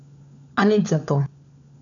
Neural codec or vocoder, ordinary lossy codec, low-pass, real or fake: codec, 16 kHz, 4 kbps, FunCodec, trained on LibriTTS, 50 frames a second; none; 7.2 kHz; fake